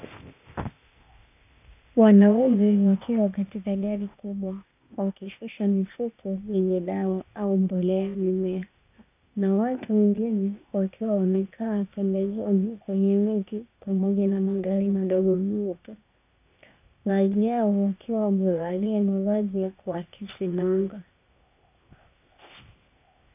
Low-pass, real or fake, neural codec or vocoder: 3.6 kHz; fake; codec, 16 kHz, 0.8 kbps, ZipCodec